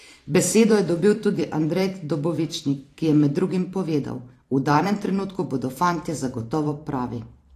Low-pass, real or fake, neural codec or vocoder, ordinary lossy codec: 14.4 kHz; fake; vocoder, 44.1 kHz, 128 mel bands every 512 samples, BigVGAN v2; AAC, 48 kbps